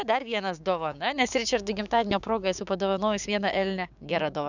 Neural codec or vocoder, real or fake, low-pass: codec, 16 kHz, 6 kbps, DAC; fake; 7.2 kHz